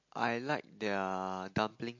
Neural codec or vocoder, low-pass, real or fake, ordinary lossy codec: none; 7.2 kHz; real; MP3, 48 kbps